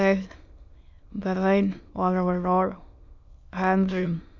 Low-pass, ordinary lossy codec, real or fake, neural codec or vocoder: 7.2 kHz; Opus, 64 kbps; fake; autoencoder, 22.05 kHz, a latent of 192 numbers a frame, VITS, trained on many speakers